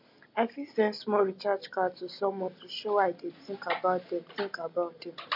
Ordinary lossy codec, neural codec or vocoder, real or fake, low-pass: MP3, 48 kbps; none; real; 5.4 kHz